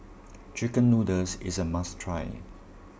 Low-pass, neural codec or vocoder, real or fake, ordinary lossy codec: none; none; real; none